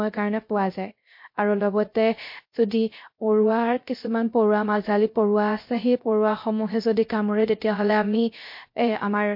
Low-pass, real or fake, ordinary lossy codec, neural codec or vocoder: 5.4 kHz; fake; MP3, 32 kbps; codec, 16 kHz, 0.3 kbps, FocalCodec